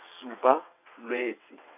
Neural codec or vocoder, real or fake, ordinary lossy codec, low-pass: vocoder, 22.05 kHz, 80 mel bands, WaveNeXt; fake; none; 3.6 kHz